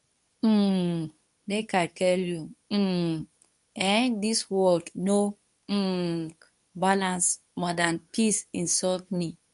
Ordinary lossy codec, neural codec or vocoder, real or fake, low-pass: none; codec, 24 kHz, 0.9 kbps, WavTokenizer, medium speech release version 2; fake; 10.8 kHz